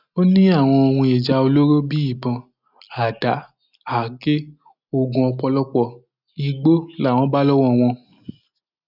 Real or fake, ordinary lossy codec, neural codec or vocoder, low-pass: real; none; none; 5.4 kHz